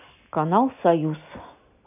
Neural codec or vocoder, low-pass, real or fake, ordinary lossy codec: none; 3.6 kHz; real; none